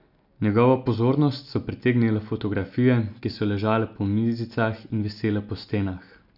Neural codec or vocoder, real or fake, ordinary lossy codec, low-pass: none; real; none; 5.4 kHz